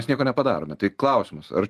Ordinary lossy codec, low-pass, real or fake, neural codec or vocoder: Opus, 32 kbps; 14.4 kHz; real; none